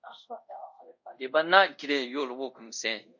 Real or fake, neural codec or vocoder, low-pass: fake; codec, 24 kHz, 0.5 kbps, DualCodec; 7.2 kHz